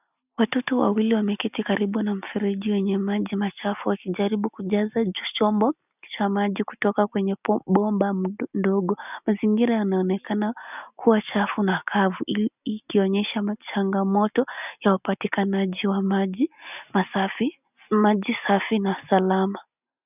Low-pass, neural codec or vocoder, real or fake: 3.6 kHz; none; real